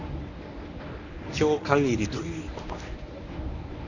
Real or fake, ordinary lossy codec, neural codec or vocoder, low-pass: fake; none; codec, 24 kHz, 0.9 kbps, WavTokenizer, medium speech release version 1; 7.2 kHz